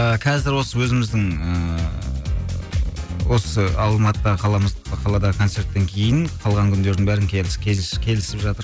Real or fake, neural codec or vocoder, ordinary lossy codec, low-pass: real; none; none; none